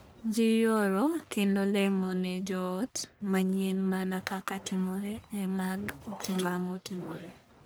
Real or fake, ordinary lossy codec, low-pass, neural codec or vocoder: fake; none; none; codec, 44.1 kHz, 1.7 kbps, Pupu-Codec